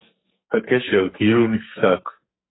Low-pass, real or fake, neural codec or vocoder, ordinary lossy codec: 7.2 kHz; fake; codec, 44.1 kHz, 2.6 kbps, SNAC; AAC, 16 kbps